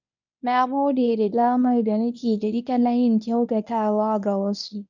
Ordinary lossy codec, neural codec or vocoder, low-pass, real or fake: none; codec, 24 kHz, 0.9 kbps, WavTokenizer, medium speech release version 1; 7.2 kHz; fake